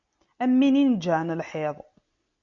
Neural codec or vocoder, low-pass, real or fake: none; 7.2 kHz; real